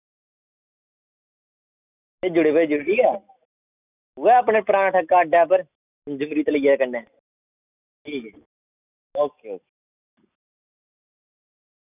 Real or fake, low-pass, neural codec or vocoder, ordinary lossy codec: real; 3.6 kHz; none; none